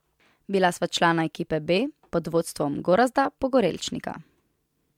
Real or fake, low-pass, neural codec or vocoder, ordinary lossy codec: real; 19.8 kHz; none; MP3, 96 kbps